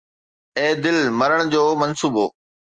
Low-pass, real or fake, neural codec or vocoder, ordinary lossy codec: 9.9 kHz; real; none; Opus, 32 kbps